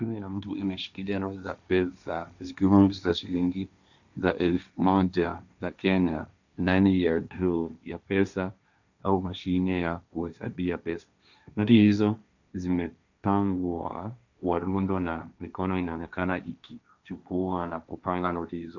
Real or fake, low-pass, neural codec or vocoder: fake; 7.2 kHz; codec, 16 kHz, 1.1 kbps, Voila-Tokenizer